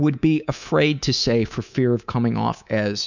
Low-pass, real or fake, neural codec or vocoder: 7.2 kHz; fake; codec, 24 kHz, 3.1 kbps, DualCodec